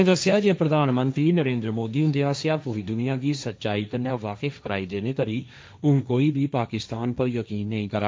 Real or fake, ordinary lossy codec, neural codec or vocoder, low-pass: fake; none; codec, 16 kHz, 1.1 kbps, Voila-Tokenizer; none